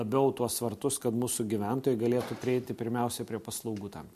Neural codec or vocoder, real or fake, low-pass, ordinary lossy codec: none; real; 14.4 kHz; MP3, 64 kbps